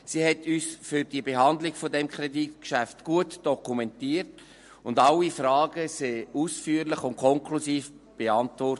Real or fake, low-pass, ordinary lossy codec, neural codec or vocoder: real; 14.4 kHz; MP3, 48 kbps; none